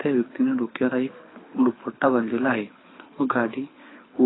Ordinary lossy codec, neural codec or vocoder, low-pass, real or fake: AAC, 16 kbps; codec, 24 kHz, 6 kbps, HILCodec; 7.2 kHz; fake